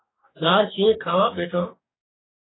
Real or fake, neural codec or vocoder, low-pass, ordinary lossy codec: fake; codec, 44.1 kHz, 2.6 kbps, DAC; 7.2 kHz; AAC, 16 kbps